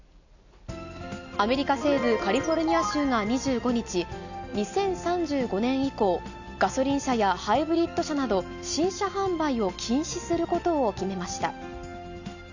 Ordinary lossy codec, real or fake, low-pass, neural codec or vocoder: none; real; 7.2 kHz; none